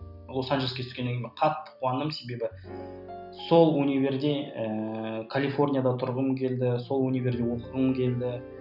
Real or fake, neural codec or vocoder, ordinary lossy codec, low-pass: real; none; none; 5.4 kHz